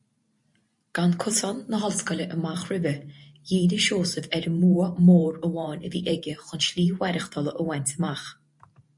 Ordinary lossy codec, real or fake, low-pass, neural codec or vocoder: MP3, 48 kbps; fake; 10.8 kHz; vocoder, 44.1 kHz, 128 mel bands every 512 samples, BigVGAN v2